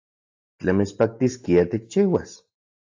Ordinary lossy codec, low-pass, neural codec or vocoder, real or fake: AAC, 48 kbps; 7.2 kHz; none; real